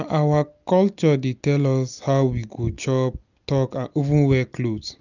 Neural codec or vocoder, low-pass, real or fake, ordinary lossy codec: none; 7.2 kHz; real; none